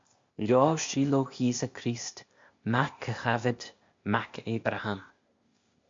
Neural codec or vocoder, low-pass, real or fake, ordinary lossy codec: codec, 16 kHz, 0.8 kbps, ZipCodec; 7.2 kHz; fake; MP3, 48 kbps